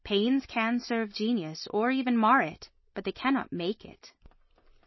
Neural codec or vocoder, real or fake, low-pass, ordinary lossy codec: none; real; 7.2 kHz; MP3, 24 kbps